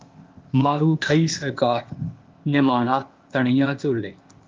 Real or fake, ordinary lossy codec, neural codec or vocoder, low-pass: fake; Opus, 32 kbps; codec, 16 kHz, 0.8 kbps, ZipCodec; 7.2 kHz